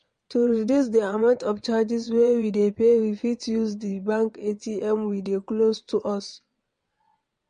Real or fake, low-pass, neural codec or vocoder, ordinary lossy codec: fake; 9.9 kHz; vocoder, 22.05 kHz, 80 mel bands, WaveNeXt; MP3, 48 kbps